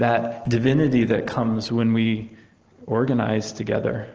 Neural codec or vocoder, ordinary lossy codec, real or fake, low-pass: none; Opus, 16 kbps; real; 7.2 kHz